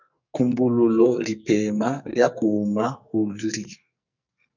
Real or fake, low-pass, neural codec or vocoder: fake; 7.2 kHz; codec, 44.1 kHz, 2.6 kbps, SNAC